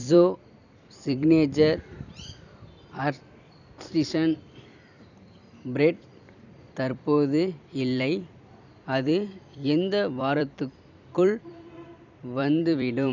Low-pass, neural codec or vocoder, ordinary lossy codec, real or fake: 7.2 kHz; vocoder, 44.1 kHz, 128 mel bands every 256 samples, BigVGAN v2; none; fake